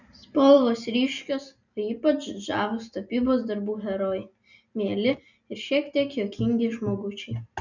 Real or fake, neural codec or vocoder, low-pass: real; none; 7.2 kHz